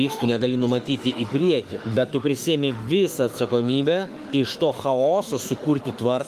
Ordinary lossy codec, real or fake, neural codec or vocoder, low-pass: Opus, 32 kbps; fake; autoencoder, 48 kHz, 32 numbers a frame, DAC-VAE, trained on Japanese speech; 14.4 kHz